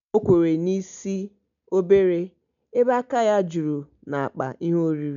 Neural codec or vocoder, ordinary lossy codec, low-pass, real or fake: none; none; 7.2 kHz; real